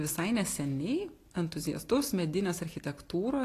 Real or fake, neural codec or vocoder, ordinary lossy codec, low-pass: real; none; AAC, 48 kbps; 14.4 kHz